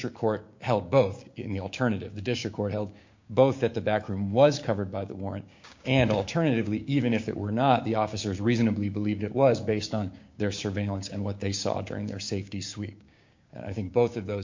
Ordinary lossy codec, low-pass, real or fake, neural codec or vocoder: MP3, 48 kbps; 7.2 kHz; fake; codec, 16 kHz, 6 kbps, DAC